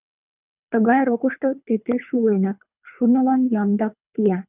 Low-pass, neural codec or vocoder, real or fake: 3.6 kHz; codec, 24 kHz, 3 kbps, HILCodec; fake